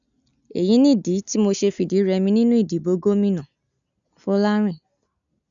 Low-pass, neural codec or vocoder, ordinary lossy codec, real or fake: 7.2 kHz; none; none; real